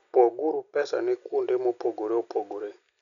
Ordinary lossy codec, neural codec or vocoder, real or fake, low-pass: none; none; real; 7.2 kHz